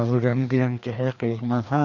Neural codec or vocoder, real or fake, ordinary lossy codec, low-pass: codec, 16 kHz, 2 kbps, FreqCodec, larger model; fake; none; 7.2 kHz